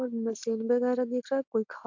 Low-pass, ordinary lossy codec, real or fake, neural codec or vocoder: 7.2 kHz; MP3, 48 kbps; real; none